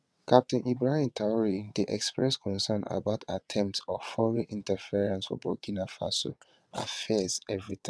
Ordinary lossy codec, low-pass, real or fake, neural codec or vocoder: none; none; fake; vocoder, 22.05 kHz, 80 mel bands, WaveNeXt